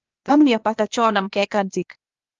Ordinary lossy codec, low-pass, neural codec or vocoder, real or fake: Opus, 24 kbps; 7.2 kHz; codec, 16 kHz, 0.8 kbps, ZipCodec; fake